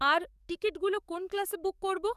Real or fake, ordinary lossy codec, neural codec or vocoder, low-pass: fake; none; codec, 44.1 kHz, 7.8 kbps, DAC; 14.4 kHz